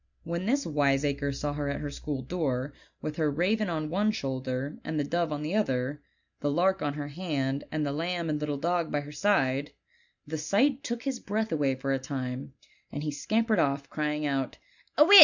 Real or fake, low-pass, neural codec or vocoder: real; 7.2 kHz; none